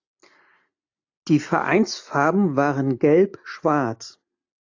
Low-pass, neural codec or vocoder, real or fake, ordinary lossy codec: 7.2 kHz; none; real; AAC, 48 kbps